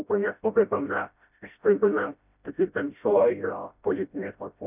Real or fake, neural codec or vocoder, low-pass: fake; codec, 16 kHz, 0.5 kbps, FreqCodec, smaller model; 3.6 kHz